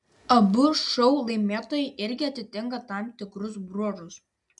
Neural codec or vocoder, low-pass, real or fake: none; 10.8 kHz; real